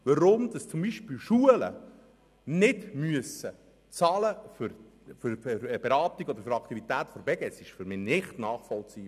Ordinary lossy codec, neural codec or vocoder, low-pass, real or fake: none; none; 14.4 kHz; real